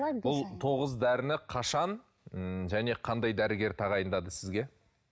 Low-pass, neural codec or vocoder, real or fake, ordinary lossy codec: none; none; real; none